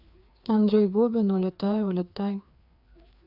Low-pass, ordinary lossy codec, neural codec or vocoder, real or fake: 5.4 kHz; none; codec, 16 kHz, 2 kbps, FreqCodec, larger model; fake